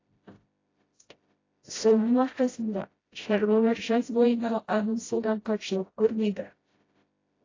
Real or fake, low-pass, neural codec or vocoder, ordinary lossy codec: fake; 7.2 kHz; codec, 16 kHz, 0.5 kbps, FreqCodec, smaller model; AAC, 32 kbps